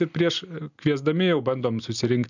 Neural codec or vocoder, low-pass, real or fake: none; 7.2 kHz; real